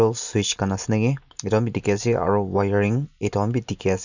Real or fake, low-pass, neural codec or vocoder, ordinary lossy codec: real; 7.2 kHz; none; none